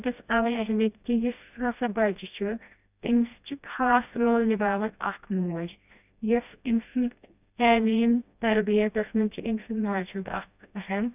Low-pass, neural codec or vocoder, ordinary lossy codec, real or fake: 3.6 kHz; codec, 16 kHz, 1 kbps, FreqCodec, smaller model; none; fake